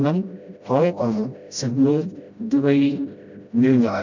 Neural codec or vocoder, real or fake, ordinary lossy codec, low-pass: codec, 16 kHz, 0.5 kbps, FreqCodec, smaller model; fake; none; 7.2 kHz